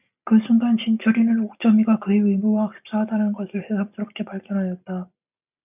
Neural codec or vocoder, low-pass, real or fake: none; 3.6 kHz; real